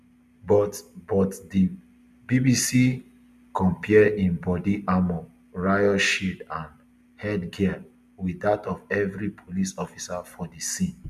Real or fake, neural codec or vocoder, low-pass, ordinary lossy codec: real; none; 14.4 kHz; none